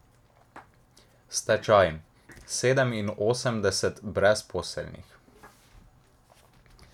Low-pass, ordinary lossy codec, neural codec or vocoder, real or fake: 19.8 kHz; none; none; real